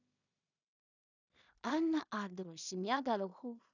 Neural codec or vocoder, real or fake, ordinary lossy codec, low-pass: codec, 16 kHz in and 24 kHz out, 0.4 kbps, LongCat-Audio-Codec, two codebook decoder; fake; none; 7.2 kHz